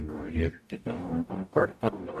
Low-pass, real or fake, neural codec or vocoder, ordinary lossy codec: 14.4 kHz; fake; codec, 44.1 kHz, 0.9 kbps, DAC; AAC, 96 kbps